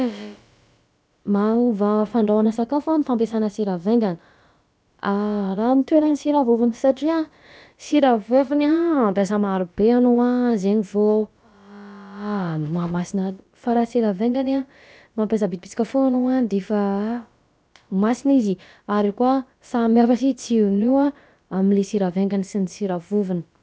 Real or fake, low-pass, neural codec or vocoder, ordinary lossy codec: fake; none; codec, 16 kHz, about 1 kbps, DyCAST, with the encoder's durations; none